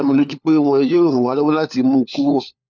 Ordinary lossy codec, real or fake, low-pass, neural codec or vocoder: none; fake; none; codec, 16 kHz, 4 kbps, FunCodec, trained on LibriTTS, 50 frames a second